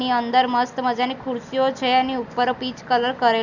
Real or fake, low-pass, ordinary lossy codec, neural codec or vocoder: real; 7.2 kHz; none; none